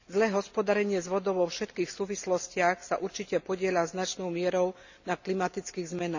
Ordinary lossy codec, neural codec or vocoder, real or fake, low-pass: none; none; real; 7.2 kHz